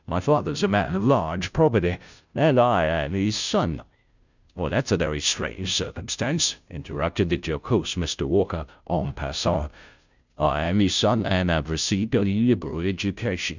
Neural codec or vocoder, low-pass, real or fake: codec, 16 kHz, 0.5 kbps, FunCodec, trained on Chinese and English, 25 frames a second; 7.2 kHz; fake